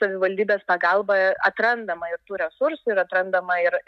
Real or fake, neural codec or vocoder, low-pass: real; none; 14.4 kHz